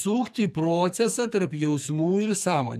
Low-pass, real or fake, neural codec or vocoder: 14.4 kHz; fake; codec, 44.1 kHz, 2.6 kbps, SNAC